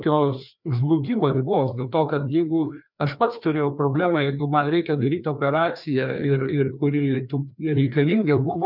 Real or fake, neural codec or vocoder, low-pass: fake; codec, 16 kHz, 2 kbps, FreqCodec, larger model; 5.4 kHz